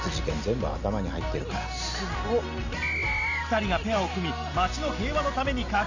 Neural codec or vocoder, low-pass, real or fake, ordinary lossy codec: none; 7.2 kHz; real; none